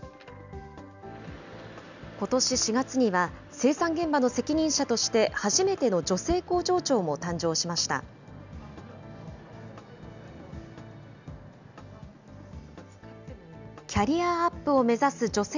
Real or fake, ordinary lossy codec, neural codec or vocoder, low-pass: real; none; none; 7.2 kHz